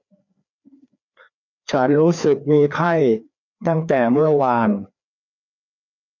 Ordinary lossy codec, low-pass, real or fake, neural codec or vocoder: none; 7.2 kHz; fake; codec, 16 kHz, 2 kbps, FreqCodec, larger model